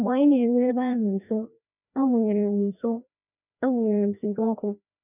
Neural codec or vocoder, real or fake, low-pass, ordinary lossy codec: codec, 16 kHz, 1 kbps, FreqCodec, larger model; fake; 3.6 kHz; none